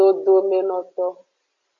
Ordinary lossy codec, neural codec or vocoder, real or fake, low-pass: AAC, 64 kbps; none; real; 7.2 kHz